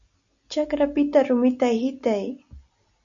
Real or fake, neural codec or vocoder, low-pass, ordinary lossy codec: real; none; 7.2 kHz; Opus, 64 kbps